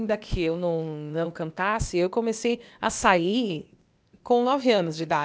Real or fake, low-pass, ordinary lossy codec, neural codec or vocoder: fake; none; none; codec, 16 kHz, 0.8 kbps, ZipCodec